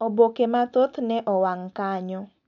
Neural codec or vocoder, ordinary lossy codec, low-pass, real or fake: none; none; 7.2 kHz; real